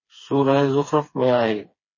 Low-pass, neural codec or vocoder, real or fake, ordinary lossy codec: 7.2 kHz; codec, 16 kHz, 4 kbps, FreqCodec, smaller model; fake; MP3, 32 kbps